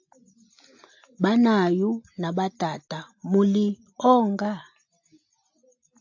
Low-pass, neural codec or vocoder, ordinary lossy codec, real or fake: 7.2 kHz; none; AAC, 48 kbps; real